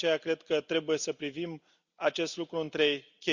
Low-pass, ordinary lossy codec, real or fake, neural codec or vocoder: 7.2 kHz; Opus, 64 kbps; real; none